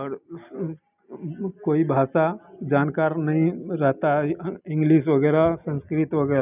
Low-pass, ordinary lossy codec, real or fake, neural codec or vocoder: 3.6 kHz; none; real; none